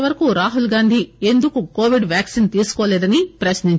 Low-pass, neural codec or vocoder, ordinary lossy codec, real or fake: none; none; none; real